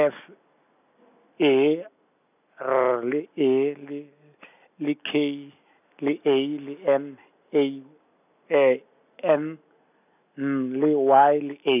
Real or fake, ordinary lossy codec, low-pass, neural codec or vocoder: real; none; 3.6 kHz; none